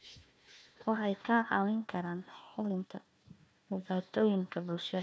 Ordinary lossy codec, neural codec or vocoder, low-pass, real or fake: none; codec, 16 kHz, 1 kbps, FunCodec, trained on Chinese and English, 50 frames a second; none; fake